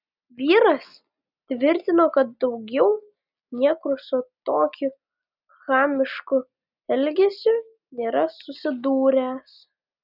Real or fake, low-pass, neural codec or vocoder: real; 5.4 kHz; none